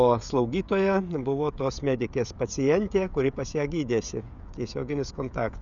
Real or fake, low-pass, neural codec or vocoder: fake; 7.2 kHz; codec, 16 kHz, 16 kbps, FreqCodec, smaller model